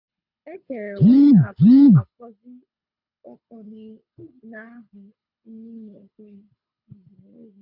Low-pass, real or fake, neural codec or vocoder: 5.4 kHz; fake; codec, 24 kHz, 6 kbps, HILCodec